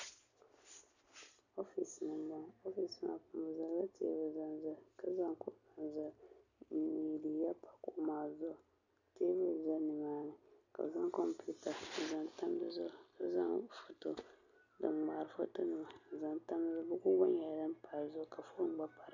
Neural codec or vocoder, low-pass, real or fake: none; 7.2 kHz; real